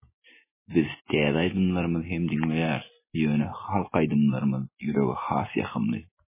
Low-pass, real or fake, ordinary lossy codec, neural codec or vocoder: 3.6 kHz; real; MP3, 16 kbps; none